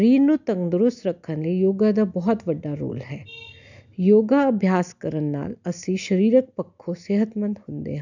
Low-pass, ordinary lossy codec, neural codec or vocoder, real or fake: 7.2 kHz; none; none; real